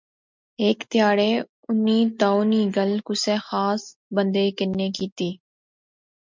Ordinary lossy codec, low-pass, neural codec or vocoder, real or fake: MP3, 64 kbps; 7.2 kHz; none; real